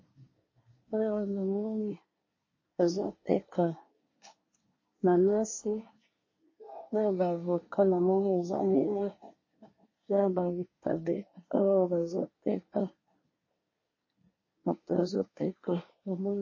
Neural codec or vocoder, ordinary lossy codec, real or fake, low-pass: codec, 24 kHz, 1 kbps, SNAC; MP3, 32 kbps; fake; 7.2 kHz